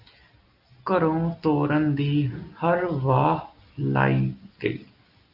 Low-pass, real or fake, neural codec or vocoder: 5.4 kHz; real; none